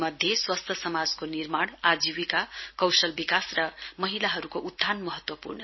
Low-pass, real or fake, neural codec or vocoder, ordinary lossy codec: 7.2 kHz; real; none; MP3, 24 kbps